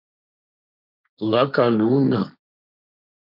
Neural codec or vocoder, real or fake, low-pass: codec, 16 kHz, 1.1 kbps, Voila-Tokenizer; fake; 5.4 kHz